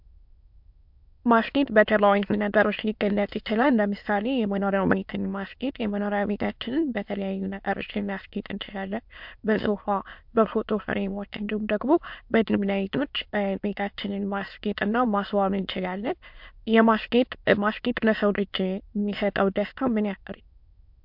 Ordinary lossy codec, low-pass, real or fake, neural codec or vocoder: MP3, 48 kbps; 5.4 kHz; fake; autoencoder, 22.05 kHz, a latent of 192 numbers a frame, VITS, trained on many speakers